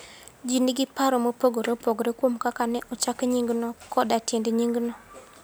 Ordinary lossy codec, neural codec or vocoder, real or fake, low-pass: none; none; real; none